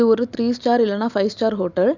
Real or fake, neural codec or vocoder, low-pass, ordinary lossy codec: real; none; 7.2 kHz; none